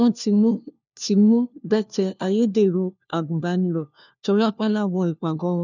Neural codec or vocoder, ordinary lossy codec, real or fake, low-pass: codec, 16 kHz, 1 kbps, FunCodec, trained on LibriTTS, 50 frames a second; none; fake; 7.2 kHz